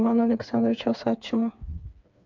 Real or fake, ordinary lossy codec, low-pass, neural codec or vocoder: fake; MP3, 64 kbps; 7.2 kHz; codec, 16 kHz, 4 kbps, FreqCodec, smaller model